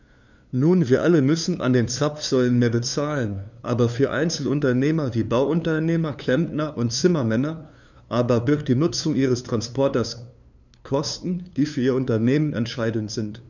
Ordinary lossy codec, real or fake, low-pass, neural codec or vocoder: none; fake; 7.2 kHz; codec, 16 kHz, 2 kbps, FunCodec, trained on LibriTTS, 25 frames a second